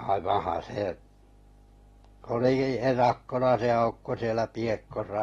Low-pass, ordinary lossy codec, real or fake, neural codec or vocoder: 19.8 kHz; AAC, 32 kbps; real; none